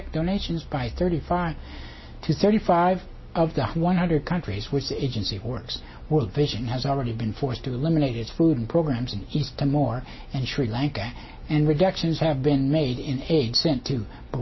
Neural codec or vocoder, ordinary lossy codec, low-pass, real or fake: none; MP3, 24 kbps; 7.2 kHz; real